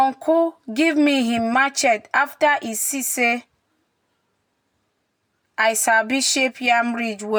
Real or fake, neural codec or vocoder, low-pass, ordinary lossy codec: real; none; none; none